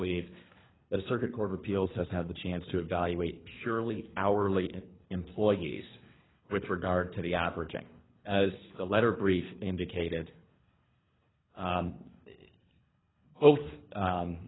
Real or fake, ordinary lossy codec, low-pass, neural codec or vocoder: fake; AAC, 16 kbps; 7.2 kHz; codec, 24 kHz, 3 kbps, HILCodec